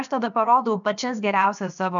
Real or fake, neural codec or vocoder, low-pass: fake; codec, 16 kHz, about 1 kbps, DyCAST, with the encoder's durations; 7.2 kHz